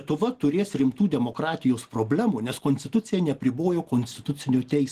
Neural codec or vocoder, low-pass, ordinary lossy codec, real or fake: none; 14.4 kHz; Opus, 16 kbps; real